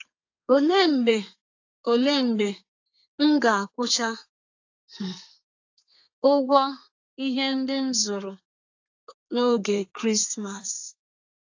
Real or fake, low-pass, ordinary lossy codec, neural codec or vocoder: fake; 7.2 kHz; AAC, 48 kbps; codec, 32 kHz, 1.9 kbps, SNAC